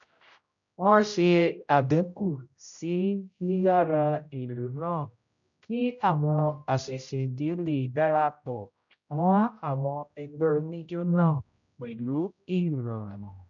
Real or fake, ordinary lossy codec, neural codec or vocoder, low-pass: fake; MP3, 96 kbps; codec, 16 kHz, 0.5 kbps, X-Codec, HuBERT features, trained on general audio; 7.2 kHz